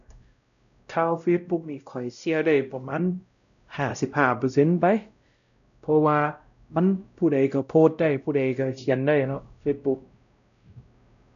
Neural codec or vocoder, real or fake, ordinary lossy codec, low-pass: codec, 16 kHz, 0.5 kbps, X-Codec, WavLM features, trained on Multilingual LibriSpeech; fake; none; 7.2 kHz